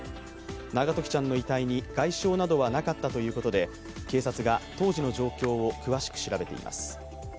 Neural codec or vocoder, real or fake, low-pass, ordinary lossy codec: none; real; none; none